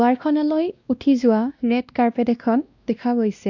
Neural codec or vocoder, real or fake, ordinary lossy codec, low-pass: codec, 16 kHz, 1 kbps, X-Codec, WavLM features, trained on Multilingual LibriSpeech; fake; none; 7.2 kHz